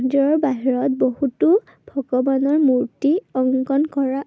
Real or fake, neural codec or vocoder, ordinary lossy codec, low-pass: real; none; none; none